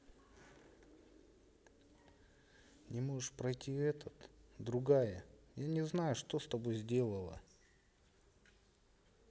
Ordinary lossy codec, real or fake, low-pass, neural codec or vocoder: none; real; none; none